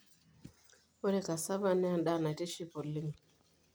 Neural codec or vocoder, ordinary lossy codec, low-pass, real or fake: none; none; none; real